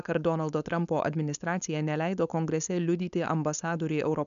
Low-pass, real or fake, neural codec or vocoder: 7.2 kHz; fake; codec, 16 kHz, 4.8 kbps, FACodec